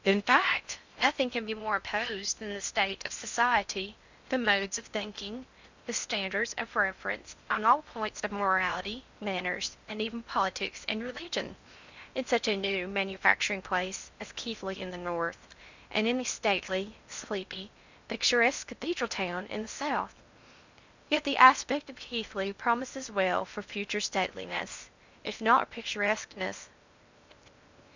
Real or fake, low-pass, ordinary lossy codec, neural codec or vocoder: fake; 7.2 kHz; Opus, 64 kbps; codec, 16 kHz in and 24 kHz out, 0.6 kbps, FocalCodec, streaming, 4096 codes